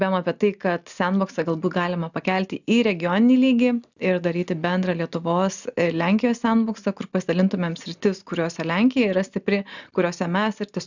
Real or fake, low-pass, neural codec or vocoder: real; 7.2 kHz; none